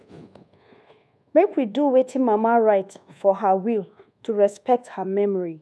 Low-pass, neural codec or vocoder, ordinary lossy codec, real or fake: none; codec, 24 kHz, 1.2 kbps, DualCodec; none; fake